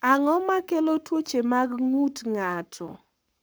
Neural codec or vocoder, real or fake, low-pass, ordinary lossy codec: codec, 44.1 kHz, 7.8 kbps, Pupu-Codec; fake; none; none